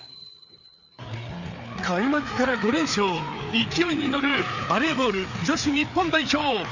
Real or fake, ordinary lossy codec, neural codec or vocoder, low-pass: fake; none; codec, 16 kHz, 4 kbps, FreqCodec, larger model; 7.2 kHz